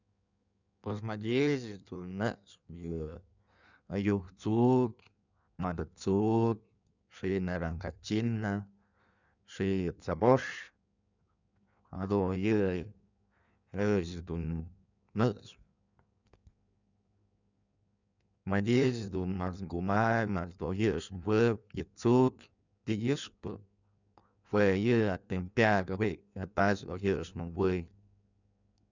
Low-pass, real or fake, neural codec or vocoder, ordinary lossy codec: 7.2 kHz; fake; codec, 16 kHz in and 24 kHz out, 1.1 kbps, FireRedTTS-2 codec; none